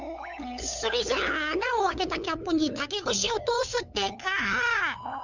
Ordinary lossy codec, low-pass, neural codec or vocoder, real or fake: none; 7.2 kHz; codec, 16 kHz, 8 kbps, FunCodec, trained on LibriTTS, 25 frames a second; fake